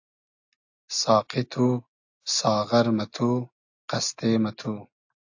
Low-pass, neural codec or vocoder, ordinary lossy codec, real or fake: 7.2 kHz; none; AAC, 48 kbps; real